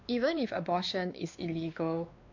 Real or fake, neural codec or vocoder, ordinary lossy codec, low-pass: fake; codec, 16 kHz, 2 kbps, X-Codec, WavLM features, trained on Multilingual LibriSpeech; MP3, 64 kbps; 7.2 kHz